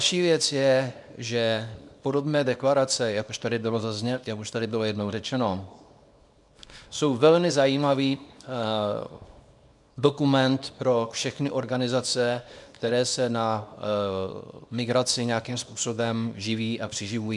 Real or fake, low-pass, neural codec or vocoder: fake; 10.8 kHz; codec, 24 kHz, 0.9 kbps, WavTokenizer, small release